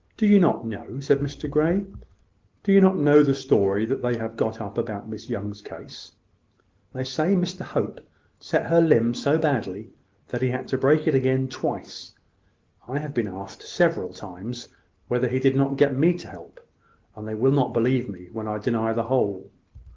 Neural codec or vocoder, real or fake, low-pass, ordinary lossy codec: none; real; 7.2 kHz; Opus, 16 kbps